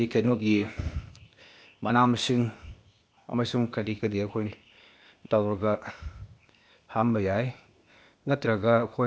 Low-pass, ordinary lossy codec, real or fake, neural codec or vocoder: none; none; fake; codec, 16 kHz, 0.8 kbps, ZipCodec